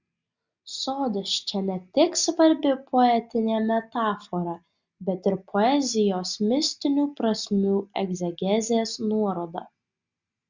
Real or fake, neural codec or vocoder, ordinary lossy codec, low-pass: real; none; Opus, 64 kbps; 7.2 kHz